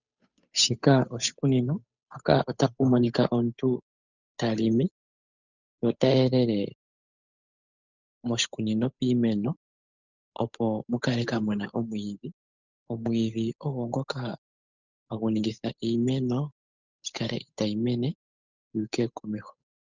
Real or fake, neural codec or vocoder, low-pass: fake; codec, 16 kHz, 8 kbps, FunCodec, trained on Chinese and English, 25 frames a second; 7.2 kHz